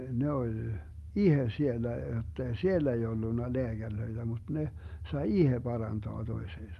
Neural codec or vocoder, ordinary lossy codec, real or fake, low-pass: none; Opus, 32 kbps; real; 14.4 kHz